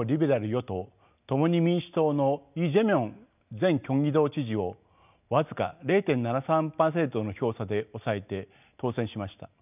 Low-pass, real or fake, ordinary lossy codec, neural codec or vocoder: 3.6 kHz; real; none; none